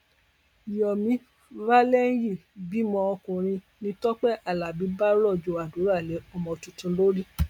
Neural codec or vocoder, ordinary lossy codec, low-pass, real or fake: none; MP3, 96 kbps; 19.8 kHz; real